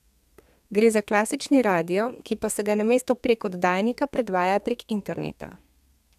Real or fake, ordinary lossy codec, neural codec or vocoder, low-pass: fake; none; codec, 32 kHz, 1.9 kbps, SNAC; 14.4 kHz